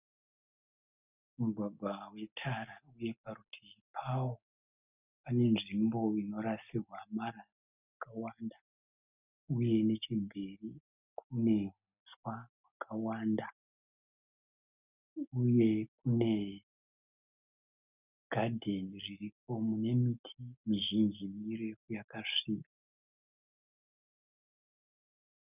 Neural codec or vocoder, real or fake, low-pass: none; real; 3.6 kHz